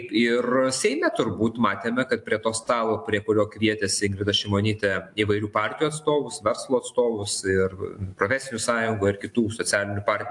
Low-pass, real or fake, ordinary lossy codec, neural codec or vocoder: 10.8 kHz; real; AAC, 64 kbps; none